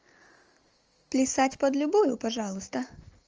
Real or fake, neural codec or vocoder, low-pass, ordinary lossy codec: real; none; 7.2 kHz; Opus, 24 kbps